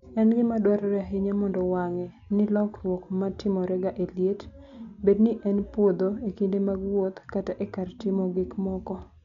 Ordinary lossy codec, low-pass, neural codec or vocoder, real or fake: none; 7.2 kHz; none; real